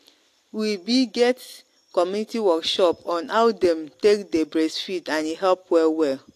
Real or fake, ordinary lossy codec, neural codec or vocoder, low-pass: real; AAC, 64 kbps; none; 14.4 kHz